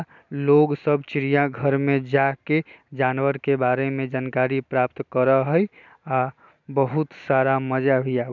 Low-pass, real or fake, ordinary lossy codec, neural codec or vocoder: 7.2 kHz; real; none; none